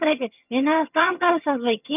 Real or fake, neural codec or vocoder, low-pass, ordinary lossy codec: fake; vocoder, 22.05 kHz, 80 mel bands, HiFi-GAN; 3.6 kHz; none